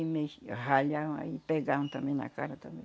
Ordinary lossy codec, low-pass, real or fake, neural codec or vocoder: none; none; real; none